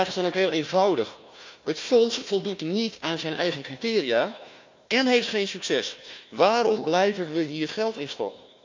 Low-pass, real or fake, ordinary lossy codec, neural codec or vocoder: 7.2 kHz; fake; MP3, 64 kbps; codec, 16 kHz, 1 kbps, FunCodec, trained on Chinese and English, 50 frames a second